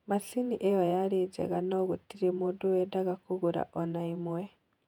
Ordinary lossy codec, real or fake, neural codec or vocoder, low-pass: none; fake; vocoder, 48 kHz, 128 mel bands, Vocos; 19.8 kHz